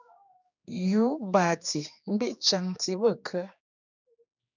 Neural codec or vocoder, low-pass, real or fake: codec, 16 kHz, 2 kbps, X-Codec, HuBERT features, trained on general audio; 7.2 kHz; fake